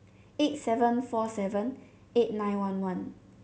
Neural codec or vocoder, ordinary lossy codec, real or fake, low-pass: none; none; real; none